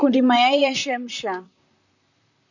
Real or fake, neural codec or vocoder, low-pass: fake; vocoder, 44.1 kHz, 128 mel bands, Pupu-Vocoder; 7.2 kHz